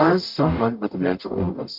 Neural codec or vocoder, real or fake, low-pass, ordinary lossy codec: codec, 44.1 kHz, 0.9 kbps, DAC; fake; 5.4 kHz; MP3, 48 kbps